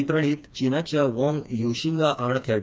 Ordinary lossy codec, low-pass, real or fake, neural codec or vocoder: none; none; fake; codec, 16 kHz, 2 kbps, FreqCodec, smaller model